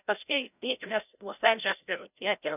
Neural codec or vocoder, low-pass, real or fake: codec, 16 kHz, 0.5 kbps, FreqCodec, larger model; 3.6 kHz; fake